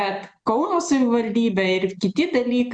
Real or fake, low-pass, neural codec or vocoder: real; 9.9 kHz; none